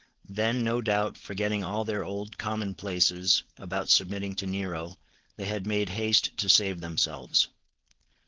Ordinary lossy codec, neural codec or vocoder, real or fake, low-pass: Opus, 16 kbps; codec, 16 kHz, 4.8 kbps, FACodec; fake; 7.2 kHz